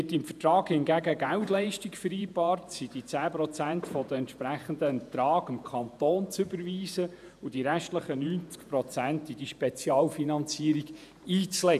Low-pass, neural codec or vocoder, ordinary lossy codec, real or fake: 14.4 kHz; vocoder, 48 kHz, 128 mel bands, Vocos; none; fake